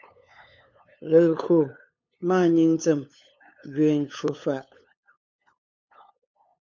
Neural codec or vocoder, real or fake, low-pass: codec, 16 kHz, 8 kbps, FunCodec, trained on LibriTTS, 25 frames a second; fake; 7.2 kHz